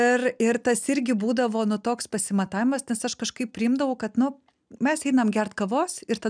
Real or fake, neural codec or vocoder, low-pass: real; none; 9.9 kHz